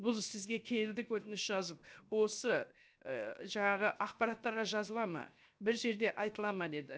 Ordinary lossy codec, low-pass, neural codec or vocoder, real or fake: none; none; codec, 16 kHz, 0.7 kbps, FocalCodec; fake